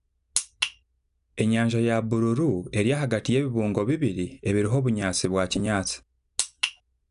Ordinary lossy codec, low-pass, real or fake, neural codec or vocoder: none; 10.8 kHz; real; none